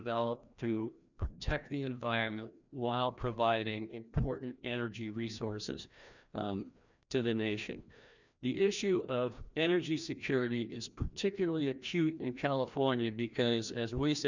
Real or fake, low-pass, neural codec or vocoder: fake; 7.2 kHz; codec, 16 kHz, 1 kbps, FreqCodec, larger model